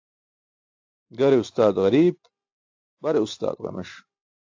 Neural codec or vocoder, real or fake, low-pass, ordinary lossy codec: none; real; 7.2 kHz; AAC, 48 kbps